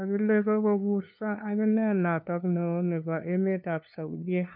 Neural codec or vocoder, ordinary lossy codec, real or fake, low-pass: codec, 16 kHz, 2 kbps, FunCodec, trained on LibriTTS, 25 frames a second; none; fake; 5.4 kHz